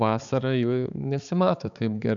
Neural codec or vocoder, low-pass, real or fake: codec, 16 kHz, 4 kbps, X-Codec, HuBERT features, trained on balanced general audio; 7.2 kHz; fake